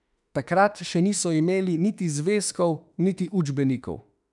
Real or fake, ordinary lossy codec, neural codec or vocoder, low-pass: fake; none; autoencoder, 48 kHz, 32 numbers a frame, DAC-VAE, trained on Japanese speech; 10.8 kHz